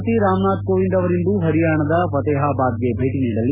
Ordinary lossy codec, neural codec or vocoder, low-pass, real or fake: none; none; 3.6 kHz; real